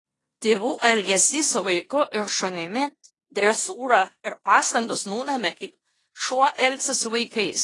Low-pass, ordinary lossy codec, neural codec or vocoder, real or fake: 10.8 kHz; AAC, 32 kbps; codec, 16 kHz in and 24 kHz out, 0.9 kbps, LongCat-Audio-Codec, four codebook decoder; fake